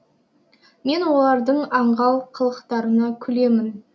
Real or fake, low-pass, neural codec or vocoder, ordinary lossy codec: real; none; none; none